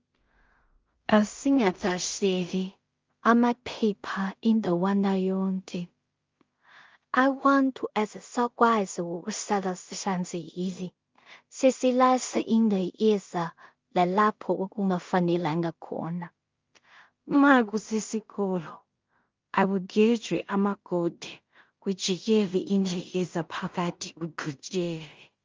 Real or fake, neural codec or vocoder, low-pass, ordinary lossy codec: fake; codec, 16 kHz in and 24 kHz out, 0.4 kbps, LongCat-Audio-Codec, two codebook decoder; 7.2 kHz; Opus, 32 kbps